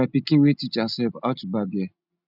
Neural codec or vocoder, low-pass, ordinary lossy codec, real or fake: none; 5.4 kHz; none; real